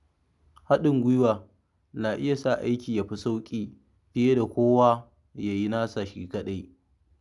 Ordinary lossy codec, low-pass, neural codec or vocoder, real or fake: none; 10.8 kHz; none; real